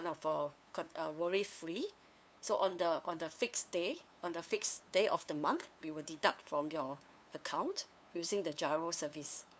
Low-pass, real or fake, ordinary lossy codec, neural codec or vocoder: none; fake; none; codec, 16 kHz, 2 kbps, FunCodec, trained on LibriTTS, 25 frames a second